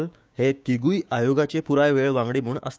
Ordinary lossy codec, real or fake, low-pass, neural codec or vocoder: none; fake; none; codec, 16 kHz, 6 kbps, DAC